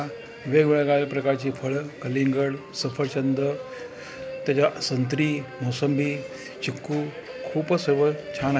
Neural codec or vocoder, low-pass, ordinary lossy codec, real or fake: none; none; none; real